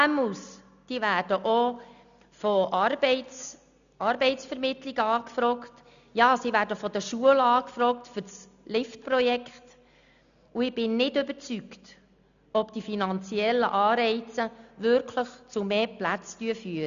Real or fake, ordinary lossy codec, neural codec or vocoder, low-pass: real; none; none; 7.2 kHz